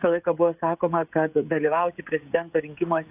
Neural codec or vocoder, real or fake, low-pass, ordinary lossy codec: codec, 16 kHz, 16 kbps, FreqCodec, smaller model; fake; 3.6 kHz; AAC, 32 kbps